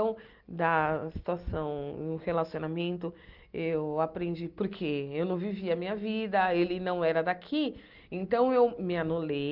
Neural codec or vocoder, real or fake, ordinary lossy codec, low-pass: none; real; Opus, 32 kbps; 5.4 kHz